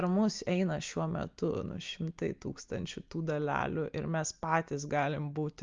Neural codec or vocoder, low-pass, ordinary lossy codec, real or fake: none; 7.2 kHz; Opus, 24 kbps; real